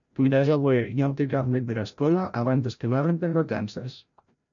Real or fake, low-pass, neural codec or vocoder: fake; 7.2 kHz; codec, 16 kHz, 0.5 kbps, FreqCodec, larger model